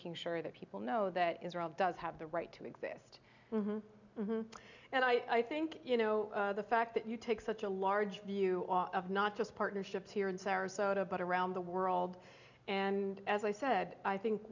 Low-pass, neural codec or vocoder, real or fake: 7.2 kHz; none; real